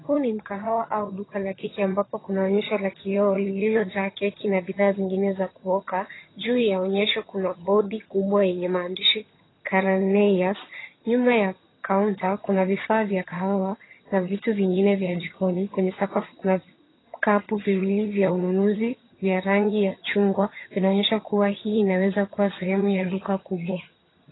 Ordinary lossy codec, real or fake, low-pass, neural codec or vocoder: AAC, 16 kbps; fake; 7.2 kHz; vocoder, 22.05 kHz, 80 mel bands, HiFi-GAN